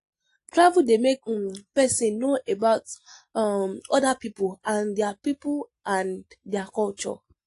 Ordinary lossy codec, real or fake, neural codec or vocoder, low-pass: AAC, 48 kbps; real; none; 10.8 kHz